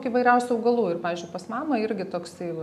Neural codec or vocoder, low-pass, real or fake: none; 14.4 kHz; real